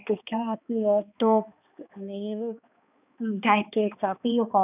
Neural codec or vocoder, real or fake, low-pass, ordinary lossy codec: codec, 16 kHz, 2 kbps, X-Codec, HuBERT features, trained on balanced general audio; fake; 3.6 kHz; none